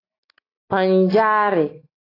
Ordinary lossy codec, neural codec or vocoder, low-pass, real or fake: AAC, 24 kbps; none; 5.4 kHz; real